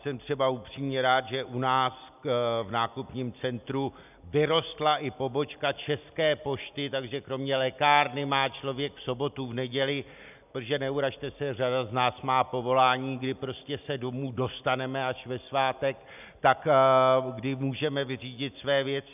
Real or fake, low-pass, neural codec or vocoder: real; 3.6 kHz; none